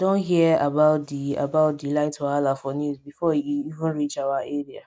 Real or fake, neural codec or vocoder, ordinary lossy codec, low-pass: real; none; none; none